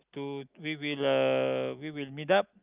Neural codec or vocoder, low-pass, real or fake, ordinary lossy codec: none; 3.6 kHz; real; Opus, 24 kbps